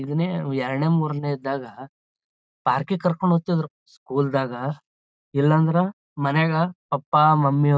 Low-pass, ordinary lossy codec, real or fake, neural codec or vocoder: none; none; real; none